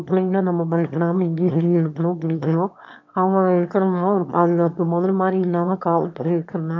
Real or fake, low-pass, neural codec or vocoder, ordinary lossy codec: fake; 7.2 kHz; autoencoder, 22.05 kHz, a latent of 192 numbers a frame, VITS, trained on one speaker; AAC, 48 kbps